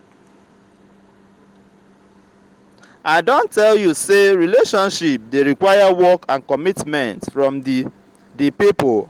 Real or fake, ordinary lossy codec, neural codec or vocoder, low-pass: real; Opus, 24 kbps; none; 19.8 kHz